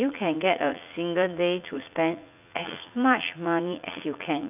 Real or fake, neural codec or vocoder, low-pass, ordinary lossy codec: fake; vocoder, 44.1 kHz, 80 mel bands, Vocos; 3.6 kHz; none